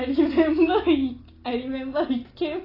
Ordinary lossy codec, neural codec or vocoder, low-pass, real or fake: AAC, 24 kbps; none; 5.4 kHz; real